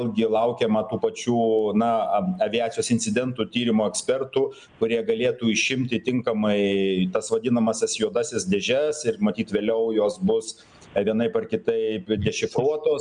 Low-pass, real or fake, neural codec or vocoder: 9.9 kHz; real; none